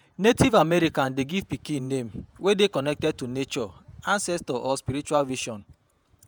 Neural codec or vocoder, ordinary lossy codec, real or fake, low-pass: vocoder, 48 kHz, 128 mel bands, Vocos; none; fake; none